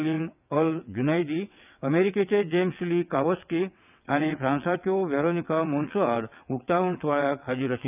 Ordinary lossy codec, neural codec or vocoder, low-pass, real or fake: none; vocoder, 22.05 kHz, 80 mel bands, WaveNeXt; 3.6 kHz; fake